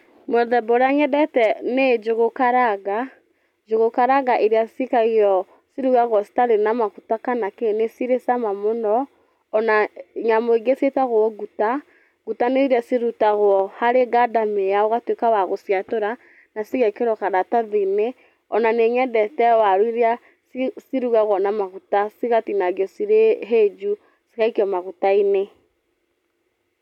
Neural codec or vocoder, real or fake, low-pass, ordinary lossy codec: none; real; 19.8 kHz; none